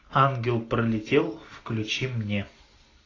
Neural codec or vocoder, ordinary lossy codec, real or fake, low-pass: none; AAC, 32 kbps; real; 7.2 kHz